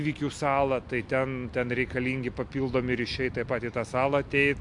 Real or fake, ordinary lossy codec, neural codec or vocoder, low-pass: real; MP3, 64 kbps; none; 10.8 kHz